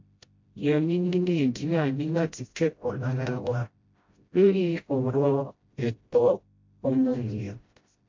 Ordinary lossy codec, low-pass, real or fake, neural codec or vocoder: MP3, 48 kbps; 7.2 kHz; fake; codec, 16 kHz, 0.5 kbps, FreqCodec, smaller model